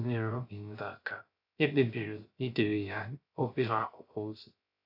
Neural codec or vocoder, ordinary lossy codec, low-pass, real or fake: codec, 16 kHz, 0.3 kbps, FocalCodec; AAC, 32 kbps; 5.4 kHz; fake